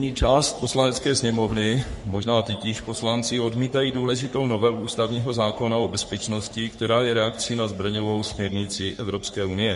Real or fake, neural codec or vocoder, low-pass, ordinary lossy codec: fake; codec, 44.1 kHz, 3.4 kbps, Pupu-Codec; 14.4 kHz; MP3, 48 kbps